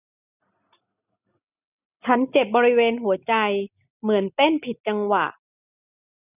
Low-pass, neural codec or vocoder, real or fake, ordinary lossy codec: 3.6 kHz; none; real; none